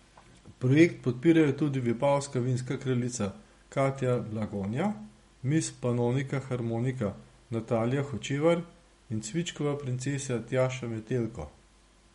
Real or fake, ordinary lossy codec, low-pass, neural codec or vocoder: real; MP3, 48 kbps; 19.8 kHz; none